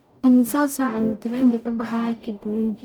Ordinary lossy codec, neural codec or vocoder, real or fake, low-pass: none; codec, 44.1 kHz, 0.9 kbps, DAC; fake; 19.8 kHz